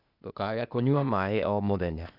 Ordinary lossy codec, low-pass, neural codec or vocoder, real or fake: none; 5.4 kHz; codec, 16 kHz, 0.8 kbps, ZipCodec; fake